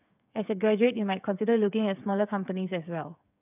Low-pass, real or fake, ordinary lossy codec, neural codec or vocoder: 3.6 kHz; fake; none; codec, 16 kHz, 8 kbps, FreqCodec, smaller model